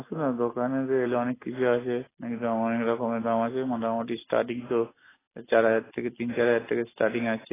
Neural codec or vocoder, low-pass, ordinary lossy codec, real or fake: none; 3.6 kHz; AAC, 16 kbps; real